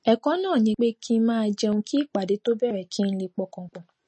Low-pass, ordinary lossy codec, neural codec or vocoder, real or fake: 10.8 kHz; MP3, 32 kbps; none; real